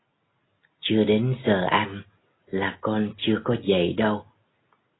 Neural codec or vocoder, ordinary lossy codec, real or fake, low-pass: none; AAC, 16 kbps; real; 7.2 kHz